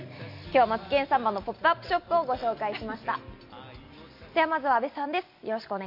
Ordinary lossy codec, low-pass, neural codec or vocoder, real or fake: MP3, 32 kbps; 5.4 kHz; none; real